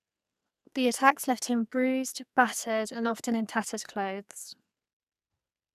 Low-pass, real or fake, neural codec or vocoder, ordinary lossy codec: 14.4 kHz; fake; codec, 44.1 kHz, 2.6 kbps, SNAC; none